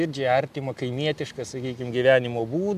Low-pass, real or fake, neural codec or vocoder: 14.4 kHz; real; none